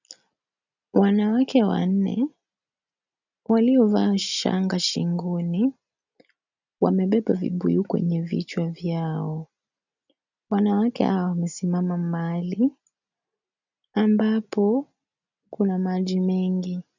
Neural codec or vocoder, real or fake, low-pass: none; real; 7.2 kHz